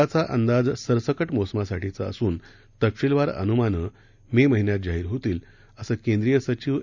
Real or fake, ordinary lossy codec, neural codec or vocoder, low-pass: real; none; none; 7.2 kHz